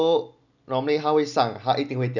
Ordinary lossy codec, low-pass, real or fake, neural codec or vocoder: none; 7.2 kHz; real; none